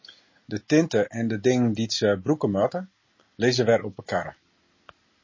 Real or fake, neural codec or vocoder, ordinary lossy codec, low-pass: real; none; MP3, 32 kbps; 7.2 kHz